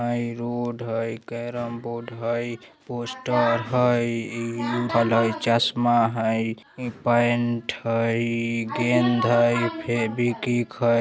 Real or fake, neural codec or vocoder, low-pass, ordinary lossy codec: real; none; none; none